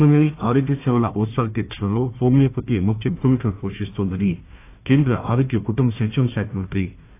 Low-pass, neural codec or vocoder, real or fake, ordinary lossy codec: 3.6 kHz; codec, 16 kHz, 1 kbps, FunCodec, trained on Chinese and English, 50 frames a second; fake; AAC, 24 kbps